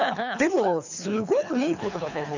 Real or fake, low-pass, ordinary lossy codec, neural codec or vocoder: fake; 7.2 kHz; none; codec, 24 kHz, 3 kbps, HILCodec